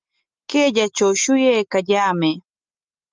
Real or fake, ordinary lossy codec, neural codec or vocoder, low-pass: real; Opus, 24 kbps; none; 7.2 kHz